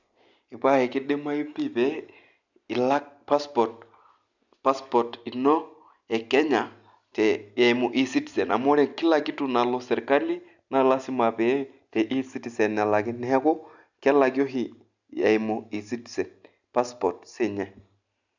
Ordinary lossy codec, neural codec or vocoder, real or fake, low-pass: none; none; real; 7.2 kHz